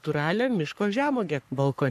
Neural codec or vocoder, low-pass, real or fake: codec, 44.1 kHz, 3.4 kbps, Pupu-Codec; 14.4 kHz; fake